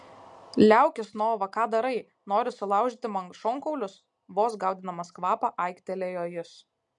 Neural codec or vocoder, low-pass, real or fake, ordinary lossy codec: none; 10.8 kHz; real; MP3, 64 kbps